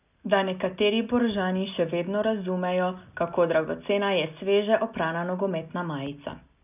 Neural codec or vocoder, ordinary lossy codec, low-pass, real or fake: none; none; 3.6 kHz; real